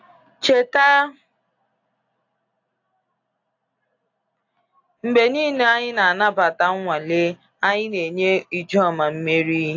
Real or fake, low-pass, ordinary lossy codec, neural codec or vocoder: real; 7.2 kHz; AAC, 48 kbps; none